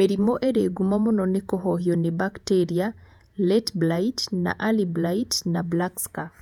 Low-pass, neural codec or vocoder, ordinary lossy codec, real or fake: 19.8 kHz; vocoder, 48 kHz, 128 mel bands, Vocos; none; fake